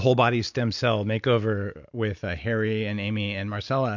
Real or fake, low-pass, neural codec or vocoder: real; 7.2 kHz; none